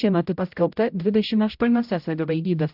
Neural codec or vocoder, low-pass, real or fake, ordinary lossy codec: codec, 16 kHz, 0.5 kbps, X-Codec, HuBERT features, trained on general audio; 5.4 kHz; fake; AAC, 48 kbps